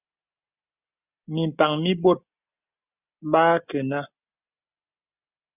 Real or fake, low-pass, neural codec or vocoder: real; 3.6 kHz; none